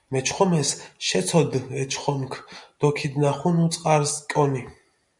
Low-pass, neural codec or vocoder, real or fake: 10.8 kHz; none; real